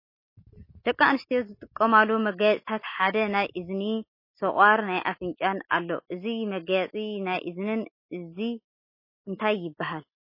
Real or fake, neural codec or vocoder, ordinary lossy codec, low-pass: real; none; MP3, 24 kbps; 5.4 kHz